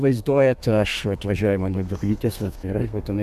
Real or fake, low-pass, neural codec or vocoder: fake; 14.4 kHz; codec, 32 kHz, 1.9 kbps, SNAC